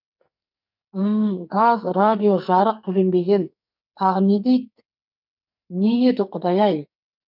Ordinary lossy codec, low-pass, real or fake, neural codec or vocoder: none; 5.4 kHz; fake; codec, 44.1 kHz, 2.6 kbps, SNAC